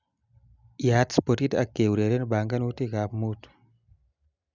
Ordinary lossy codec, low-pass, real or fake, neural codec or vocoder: none; 7.2 kHz; real; none